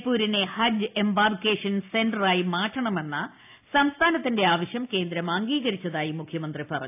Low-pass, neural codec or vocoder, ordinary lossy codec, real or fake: 3.6 kHz; none; AAC, 32 kbps; real